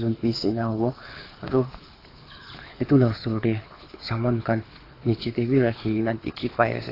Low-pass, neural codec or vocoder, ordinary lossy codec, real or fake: 5.4 kHz; codec, 24 kHz, 6 kbps, HILCodec; AAC, 24 kbps; fake